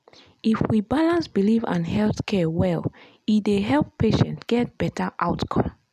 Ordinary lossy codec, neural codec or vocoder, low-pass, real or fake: none; none; 14.4 kHz; real